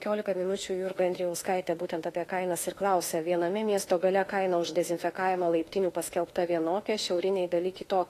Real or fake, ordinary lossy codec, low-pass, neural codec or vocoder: fake; AAC, 48 kbps; 14.4 kHz; autoencoder, 48 kHz, 32 numbers a frame, DAC-VAE, trained on Japanese speech